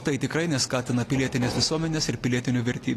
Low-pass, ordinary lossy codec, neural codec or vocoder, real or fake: 14.4 kHz; AAC, 48 kbps; none; real